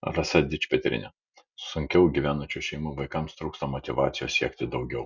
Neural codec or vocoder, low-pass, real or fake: none; 7.2 kHz; real